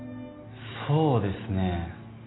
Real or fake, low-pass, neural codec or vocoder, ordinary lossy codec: real; 7.2 kHz; none; AAC, 16 kbps